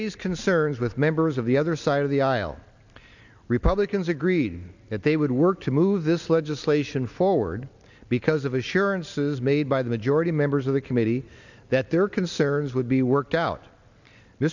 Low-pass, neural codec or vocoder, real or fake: 7.2 kHz; none; real